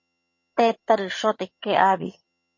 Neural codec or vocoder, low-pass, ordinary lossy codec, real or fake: vocoder, 22.05 kHz, 80 mel bands, HiFi-GAN; 7.2 kHz; MP3, 32 kbps; fake